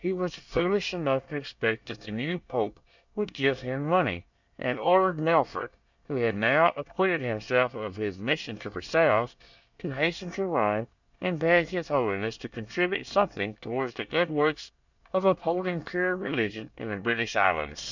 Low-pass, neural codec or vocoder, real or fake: 7.2 kHz; codec, 24 kHz, 1 kbps, SNAC; fake